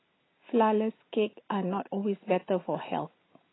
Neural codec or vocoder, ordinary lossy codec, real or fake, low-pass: none; AAC, 16 kbps; real; 7.2 kHz